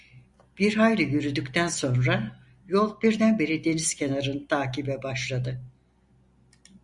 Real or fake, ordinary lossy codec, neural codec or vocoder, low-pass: real; Opus, 64 kbps; none; 10.8 kHz